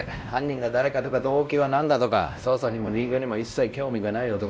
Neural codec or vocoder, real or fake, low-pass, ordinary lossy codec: codec, 16 kHz, 1 kbps, X-Codec, WavLM features, trained on Multilingual LibriSpeech; fake; none; none